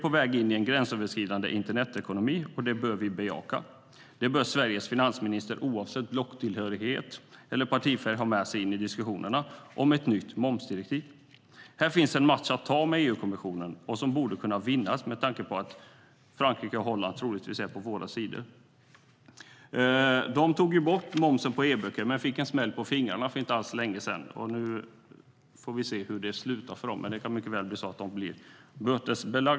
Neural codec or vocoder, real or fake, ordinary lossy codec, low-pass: none; real; none; none